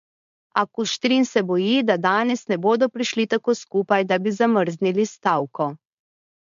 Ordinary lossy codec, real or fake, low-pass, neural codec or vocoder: MP3, 48 kbps; fake; 7.2 kHz; codec, 16 kHz, 4.8 kbps, FACodec